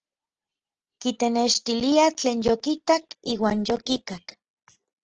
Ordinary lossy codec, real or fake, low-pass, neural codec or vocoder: Opus, 16 kbps; real; 7.2 kHz; none